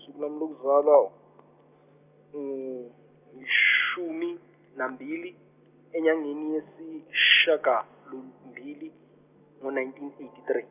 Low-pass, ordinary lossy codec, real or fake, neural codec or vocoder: 3.6 kHz; none; real; none